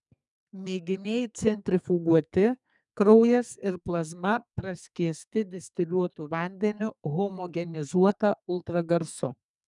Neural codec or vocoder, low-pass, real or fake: codec, 44.1 kHz, 2.6 kbps, SNAC; 10.8 kHz; fake